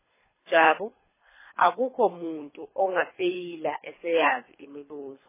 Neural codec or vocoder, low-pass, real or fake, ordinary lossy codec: codec, 24 kHz, 3 kbps, HILCodec; 3.6 kHz; fake; MP3, 16 kbps